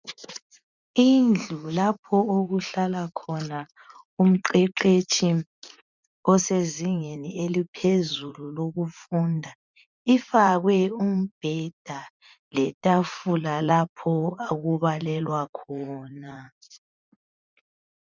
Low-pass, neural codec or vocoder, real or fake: 7.2 kHz; vocoder, 44.1 kHz, 80 mel bands, Vocos; fake